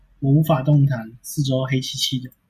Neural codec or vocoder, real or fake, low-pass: none; real; 14.4 kHz